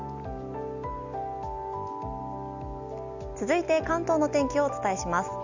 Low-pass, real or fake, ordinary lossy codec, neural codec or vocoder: 7.2 kHz; real; none; none